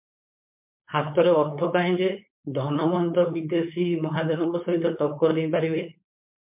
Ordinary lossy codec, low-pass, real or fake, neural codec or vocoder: MP3, 32 kbps; 3.6 kHz; fake; codec, 16 kHz, 4.8 kbps, FACodec